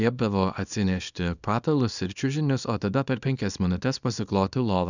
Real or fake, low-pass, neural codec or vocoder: fake; 7.2 kHz; codec, 24 kHz, 0.9 kbps, WavTokenizer, small release